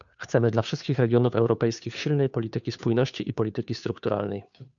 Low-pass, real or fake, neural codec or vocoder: 7.2 kHz; fake; codec, 16 kHz, 2 kbps, FunCodec, trained on Chinese and English, 25 frames a second